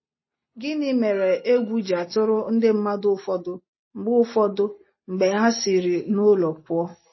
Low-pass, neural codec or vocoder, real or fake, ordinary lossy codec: 7.2 kHz; none; real; MP3, 24 kbps